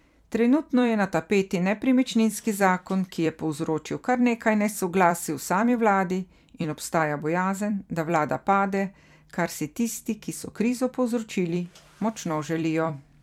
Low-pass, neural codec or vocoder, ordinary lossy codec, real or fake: 19.8 kHz; vocoder, 44.1 kHz, 128 mel bands every 256 samples, BigVGAN v2; MP3, 96 kbps; fake